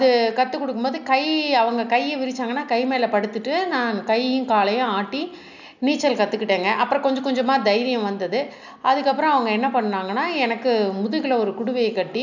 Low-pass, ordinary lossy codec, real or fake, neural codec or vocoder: 7.2 kHz; none; real; none